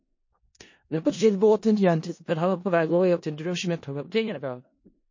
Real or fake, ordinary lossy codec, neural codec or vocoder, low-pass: fake; MP3, 32 kbps; codec, 16 kHz in and 24 kHz out, 0.4 kbps, LongCat-Audio-Codec, four codebook decoder; 7.2 kHz